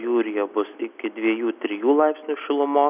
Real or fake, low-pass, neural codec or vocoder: real; 3.6 kHz; none